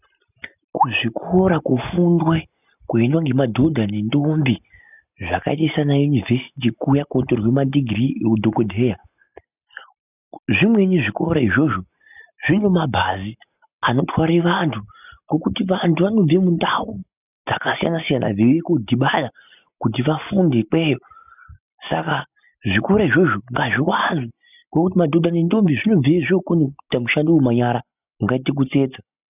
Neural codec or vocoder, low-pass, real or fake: none; 3.6 kHz; real